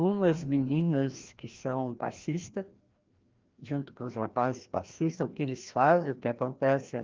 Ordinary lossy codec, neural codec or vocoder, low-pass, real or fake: Opus, 32 kbps; codec, 16 kHz, 1 kbps, FreqCodec, larger model; 7.2 kHz; fake